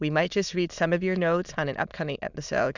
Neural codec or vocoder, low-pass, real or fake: autoencoder, 22.05 kHz, a latent of 192 numbers a frame, VITS, trained on many speakers; 7.2 kHz; fake